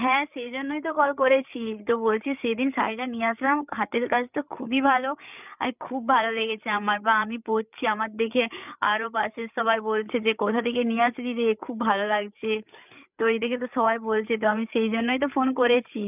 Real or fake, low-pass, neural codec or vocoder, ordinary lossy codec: fake; 3.6 kHz; codec, 16 kHz, 8 kbps, FreqCodec, larger model; none